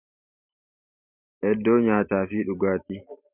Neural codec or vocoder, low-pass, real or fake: vocoder, 44.1 kHz, 128 mel bands every 512 samples, BigVGAN v2; 3.6 kHz; fake